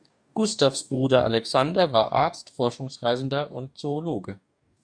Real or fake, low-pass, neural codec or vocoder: fake; 9.9 kHz; codec, 44.1 kHz, 2.6 kbps, DAC